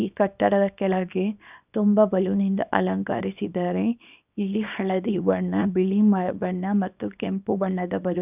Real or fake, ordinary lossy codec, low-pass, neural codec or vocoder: fake; none; 3.6 kHz; codec, 24 kHz, 0.9 kbps, WavTokenizer, small release